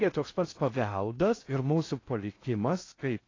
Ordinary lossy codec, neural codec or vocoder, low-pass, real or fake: AAC, 32 kbps; codec, 16 kHz in and 24 kHz out, 0.6 kbps, FocalCodec, streaming, 4096 codes; 7.2 kHz; fake